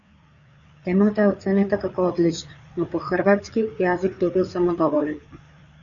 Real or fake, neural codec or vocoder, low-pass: fake; codec, 16 kHz, 4 kbps, FreqCodec, larger model; 7.2 kHz